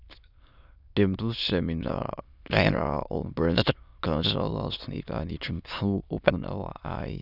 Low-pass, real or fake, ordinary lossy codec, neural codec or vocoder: 5.4 kHz; fake; none; autoencoder, 22.05 kHz, a latent of 192 numbers a frame, VITS, trained on many speakers